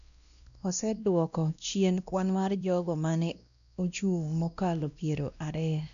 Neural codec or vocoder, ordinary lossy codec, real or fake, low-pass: codec, 16 kHz, 1 kbps, X-Codec, WavLM features, trained on Multilingual LibriSpeech; none; fake; 7.2 kHz